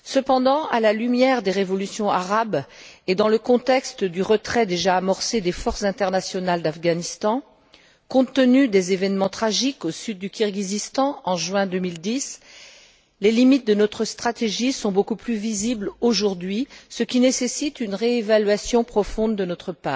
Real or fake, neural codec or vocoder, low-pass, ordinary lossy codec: real; none; none; none